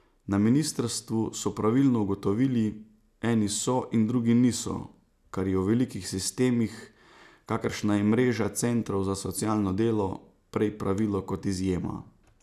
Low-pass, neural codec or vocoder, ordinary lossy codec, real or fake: 14.4 kHz; none; none; real